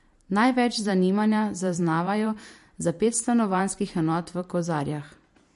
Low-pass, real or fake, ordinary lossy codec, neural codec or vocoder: 14.4 kHz; fake; MP3, 48 kbps; vocoder, 44.1 kHz, 128 mel bands every 512 samples, BigVGAN v2